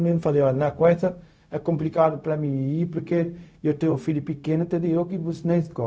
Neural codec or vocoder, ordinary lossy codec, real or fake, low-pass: codec, 16 kHz, 0.4 kbps, LongCat-Audio-Codec; none; fake; none